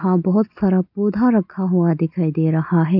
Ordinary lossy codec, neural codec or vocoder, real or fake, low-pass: none; none; real; 5.4 kHz